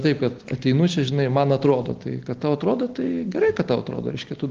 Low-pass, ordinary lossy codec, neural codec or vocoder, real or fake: 7.2 kHz; Opus, 16 kbps; none; real